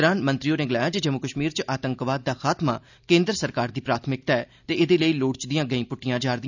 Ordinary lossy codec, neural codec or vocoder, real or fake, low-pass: none; none; real; 7.2 kHz